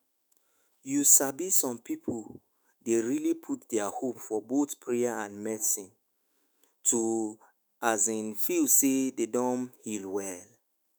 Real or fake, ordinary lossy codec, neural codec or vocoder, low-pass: fake; none; autoencoder, 48 kHz, 128 numbers a frame, DAC-VAE, trained on Japanese speech; none